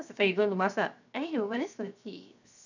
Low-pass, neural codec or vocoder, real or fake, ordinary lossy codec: 7.2 kHz; codec, 16 kHz, 0.7 kbps, FocalCodec; fake; none